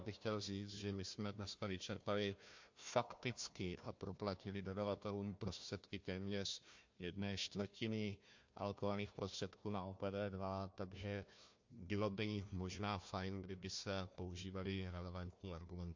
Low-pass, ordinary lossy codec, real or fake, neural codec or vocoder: 7.2 kHz; MP3, 48 kbps; fake; codec, 16 kHz, 1 kbps, FunCodec, trained on Chinese and English, 50 frames a second